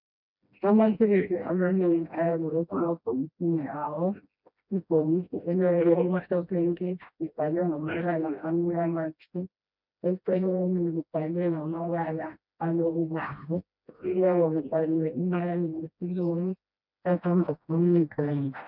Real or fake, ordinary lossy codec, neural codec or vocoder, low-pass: fake; MP3, 48 kbps; codec, 16 kHz, 1 kbps, FreqCodec, smaller model; 5.4 kHz